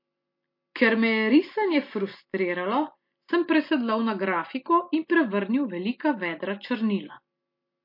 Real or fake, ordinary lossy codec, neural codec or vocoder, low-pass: real; MP3, 32 kbps; none; 5.4 kHz